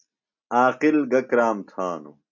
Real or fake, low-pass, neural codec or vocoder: real; 7.2 kHz; none